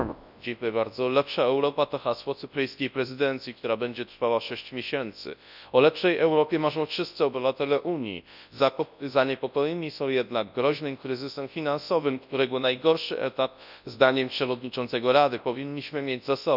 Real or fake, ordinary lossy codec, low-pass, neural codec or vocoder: fake; none; 5.4 kHz; codec, 24 kHz, 0.9 kbps, WavTokenizer, large speech release